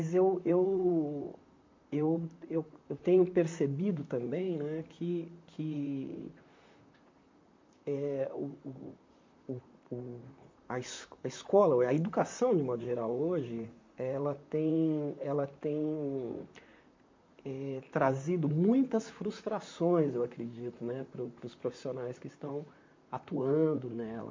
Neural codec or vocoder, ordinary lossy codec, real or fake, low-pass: vocoder, 44.1 kHz, 128 mel bands, Pupu-Vocoder; MP3, 48 kbps; fake; 7.2 kHz